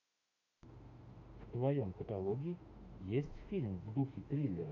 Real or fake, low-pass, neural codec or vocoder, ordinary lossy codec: fake; 7.2 kHz; autoencoder, 48 kHz, 32 numbers a frame, DAC-VAE, trained on Japanese speech; MP3, 48 kbps